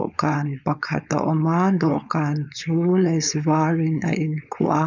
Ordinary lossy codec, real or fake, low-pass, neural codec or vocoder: none; fake; 7.2 kHz; codec, 16 kHz, 4.8 kbps, FACodec